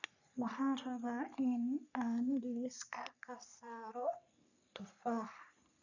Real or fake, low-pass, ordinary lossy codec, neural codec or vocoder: fake; 7.2 kHz; none; codec, 44.1 kHz, 3.4 kbps, Pupu-Codec